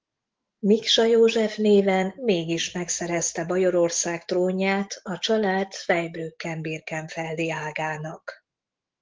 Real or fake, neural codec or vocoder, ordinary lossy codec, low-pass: fake; autoencoder, 48 kHz, 128 numbers a frame, DAC-VAE, trained on Japanese speech; Opus, 16 kbps; 7.2 kHz